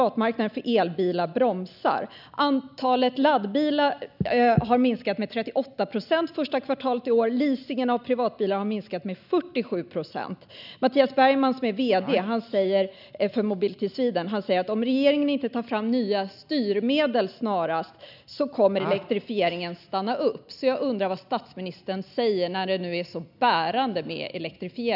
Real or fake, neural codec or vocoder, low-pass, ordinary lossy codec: real; none; 5.4 kHz; none